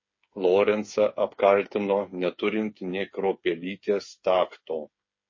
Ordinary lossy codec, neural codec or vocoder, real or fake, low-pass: MP3, 32 kbps; codec, 16 kHz, 8 kbps, FreqCodec, smaller model; fake; 7.2 kHz